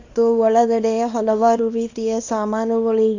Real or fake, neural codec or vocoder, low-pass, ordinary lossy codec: fake; codec, 24 kHz, 0.9 kbps, WavTokenizer, small release; 7.2 kHz; AAC, 48 kbps